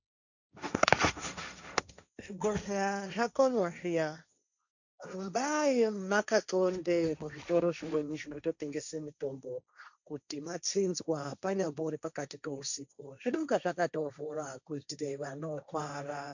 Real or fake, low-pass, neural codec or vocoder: fake; 7.2 kHz; codec, 16 kHz, 1.1 kbps, Voila-Tokenizer